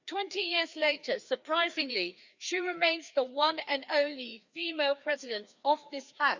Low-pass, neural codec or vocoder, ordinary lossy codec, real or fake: 7.2 kHz; codec, 16 kHz, 2 kbps, FreqCodec, larger model; Opus, 64 kbps; fake